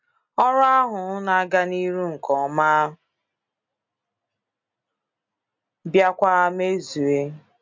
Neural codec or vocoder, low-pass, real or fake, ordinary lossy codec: none; 7.2 kHz; real; MP3, 64 kbps